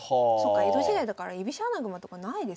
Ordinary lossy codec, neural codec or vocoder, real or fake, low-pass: none; none; real; none